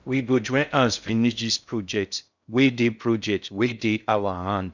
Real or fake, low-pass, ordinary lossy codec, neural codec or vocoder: fake; 7.2 kHz; none; codec, 16 kHz in and 24 kHz out, 0.6 kbps, FocalCodec, streaming, 2048 codes